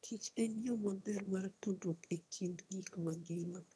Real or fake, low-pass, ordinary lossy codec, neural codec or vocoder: fake; none; none; autoencoder, 22.05 kHz, a latent of 192 numbers a frame, VITS, trained on one speaker